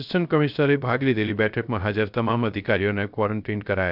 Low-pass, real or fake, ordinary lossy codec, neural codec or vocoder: 5.4 kHz; fake; none; codec, 16 kHz, 0.3 kbps, FocalCodec